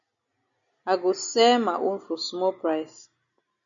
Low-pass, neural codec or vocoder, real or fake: 7.2 kHz; none; real